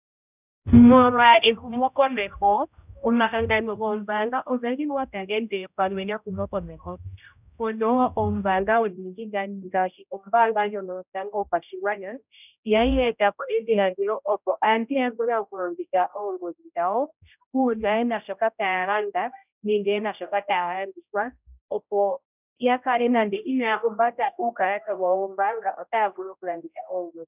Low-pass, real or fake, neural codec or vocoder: 3.6 kHz; fake; codec, 16 kHz, 0.5 kbps, X-Codec, HuBERT features, trained on general audio